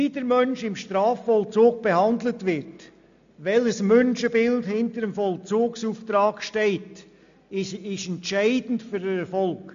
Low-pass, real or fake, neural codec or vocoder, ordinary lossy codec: 7.2 kHz; real; none; MP3, 64 kbps